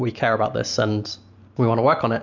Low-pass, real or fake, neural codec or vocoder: 7.2 kHz; real; none